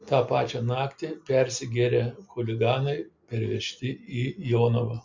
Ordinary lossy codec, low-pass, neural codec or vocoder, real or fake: MP3, 64 kbps; 7.2 kHz; none; real